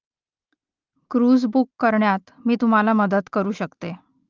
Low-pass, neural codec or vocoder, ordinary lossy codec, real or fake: 7.2 kHz; none; Opus, 32 kbps; real